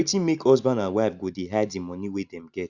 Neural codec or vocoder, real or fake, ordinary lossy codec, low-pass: none; real; Opus, 64 kbps; 7.2 kHz